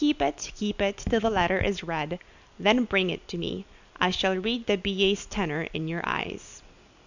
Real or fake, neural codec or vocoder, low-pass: real; none; 7.2 kHz